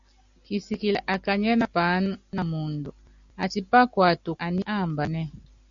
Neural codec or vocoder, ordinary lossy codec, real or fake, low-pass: none; Opus, 64 kbps; real; 7.2 kHz